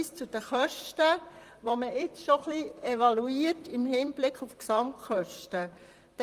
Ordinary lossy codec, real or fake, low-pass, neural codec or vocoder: Opus, 32 kbps; fake; 14.4 kHz; vocoder, 44.1 kHz, 128 mel bands, Pupu-Vocoder